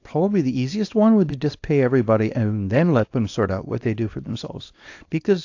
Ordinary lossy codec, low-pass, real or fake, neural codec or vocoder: AAC, 48 kbps; 7.2 kHz; fake; codec, 24 kHz, 0.9 kbps, WavTokenizer, small release